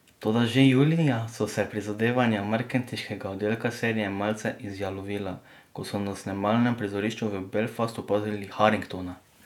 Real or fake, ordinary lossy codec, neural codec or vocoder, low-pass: fake; none; vocoder, 48 kHz, 128 mel bands, Vocos; 19.8 kHz